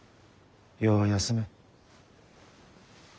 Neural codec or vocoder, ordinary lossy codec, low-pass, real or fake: none; none; none; real